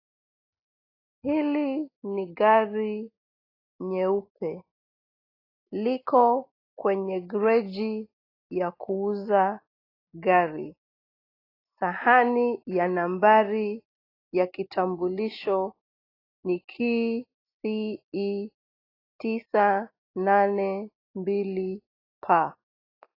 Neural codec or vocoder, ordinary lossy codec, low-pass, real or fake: none; AAC, 24 kbps; 5.4 kHz; real